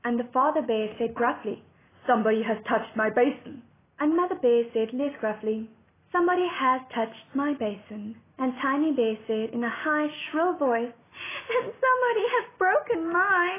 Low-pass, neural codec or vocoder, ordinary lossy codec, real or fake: 3.6 kHz; none; AAC, 16 kbps; real